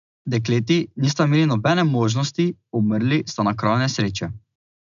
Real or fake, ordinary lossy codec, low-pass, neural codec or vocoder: real; MP3, 96 kbps; 7.2 kHz; none